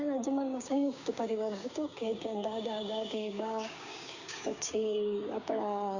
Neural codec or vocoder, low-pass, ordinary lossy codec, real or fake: codec, 16 kHz, 6 kbps, DAC; 7.2 kHz; Opus, 64 kbps; fake